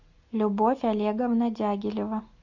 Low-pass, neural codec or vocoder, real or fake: 7.2 kHz; none; real